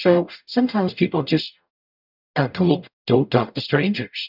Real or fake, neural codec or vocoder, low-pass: fake; codec, 44.1 kHz, 0.9 kbps, DAC; 5.4 kHz